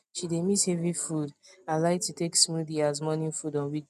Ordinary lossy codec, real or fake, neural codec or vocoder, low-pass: none; real; none; 14.4 kHz